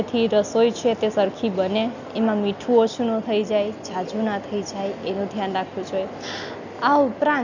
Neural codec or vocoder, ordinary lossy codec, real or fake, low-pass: none; none; real; 7.2 kHz